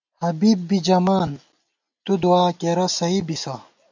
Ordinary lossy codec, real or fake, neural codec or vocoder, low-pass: MP3, 64 kbps; real; none; 7.2 kHz